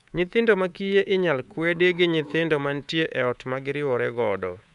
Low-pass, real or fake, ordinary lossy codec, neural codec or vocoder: 10.8 kHz; fake; none; codec, 24 kHz, 3.1 kbps, DualCodec